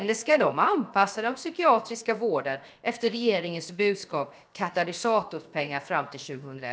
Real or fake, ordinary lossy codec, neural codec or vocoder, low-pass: fake; none; codec, 16 kHz, 0.7 kbps, FocalCodec; none